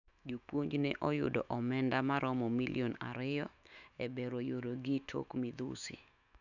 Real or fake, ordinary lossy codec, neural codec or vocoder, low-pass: fake; none; autoencoder, 48 kHz, 128 numbers a frame, DAC-VAE, trained on Japanese speech; 7.2 kHz